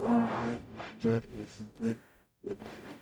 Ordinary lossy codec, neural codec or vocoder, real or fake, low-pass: none; codec, 44.1 kHz, 0.9 kbps, DAC; fake; none